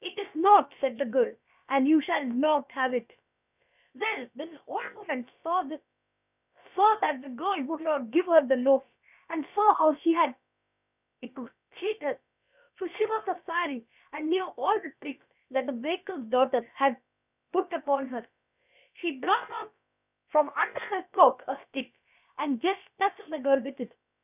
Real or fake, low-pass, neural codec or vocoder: fake; 3.6 kHz; codec, 16 kHz, about 1 kbps, DyCAST, with the encoder's durations